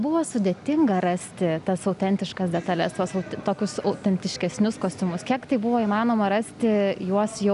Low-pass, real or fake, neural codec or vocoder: 10.8 kHz; real; none